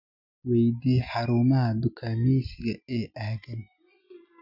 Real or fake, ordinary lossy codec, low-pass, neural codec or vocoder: real; none; 5.4 kHz; none